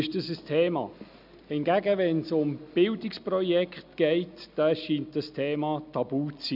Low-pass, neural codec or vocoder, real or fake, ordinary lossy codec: 5.4 kHz; none; real; none